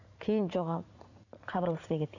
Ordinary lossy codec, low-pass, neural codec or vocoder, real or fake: none; 7.2 kHz; vocoder, 44.1 kHz, 80 mel bands, Vocos; fake